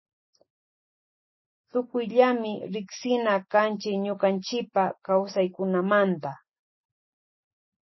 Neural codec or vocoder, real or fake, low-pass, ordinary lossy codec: none; real; 7.2 kHz; MP3, 24 kbps